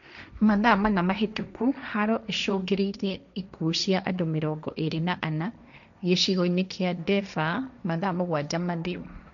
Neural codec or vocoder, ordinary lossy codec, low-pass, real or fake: codec, 16 kHz, 1.1 kbps, Voila-Tokenizer; none; 7.2 kHz; fake